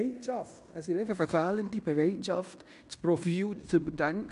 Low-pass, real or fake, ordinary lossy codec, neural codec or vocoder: 10.8 kHz; fake; MP3, 64 kbps; codec, 16 kHz in and 24 kHz out, 0.9 kbps, LongCat-Audio-Codec, fine tuned four codebook decoder